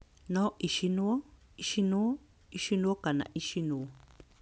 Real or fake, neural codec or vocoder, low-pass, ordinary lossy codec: real; none; none; none